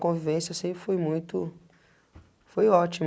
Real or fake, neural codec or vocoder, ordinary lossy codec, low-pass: real; none; none; none